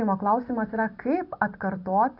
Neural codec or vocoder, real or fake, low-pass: none; real; 5.4 kHz